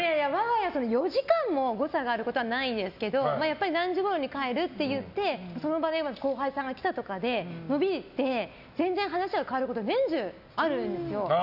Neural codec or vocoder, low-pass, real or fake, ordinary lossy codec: none; 5.4 kHz; real; none